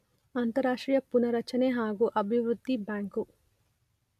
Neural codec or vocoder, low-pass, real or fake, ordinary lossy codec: none; 14.4 kHz; real; none